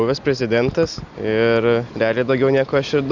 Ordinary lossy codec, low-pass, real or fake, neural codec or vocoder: Opus, 64 kbps; 7.2 kHz; real; none